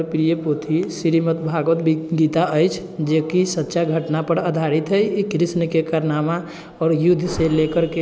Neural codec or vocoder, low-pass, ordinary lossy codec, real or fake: none; none; none; real